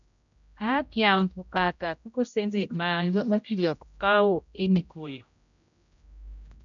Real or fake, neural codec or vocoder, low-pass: fake; codec, 16 kHz, 0.5 kbps, X-Codec, HuBERT features, trained on general audio; 7.2 kHz